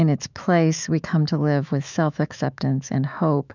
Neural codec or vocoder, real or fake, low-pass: autoencoder, 48 kHz, 128 numbers a frame, DAC-VAE, trained on Japanese speech; fake; 7.2 kHz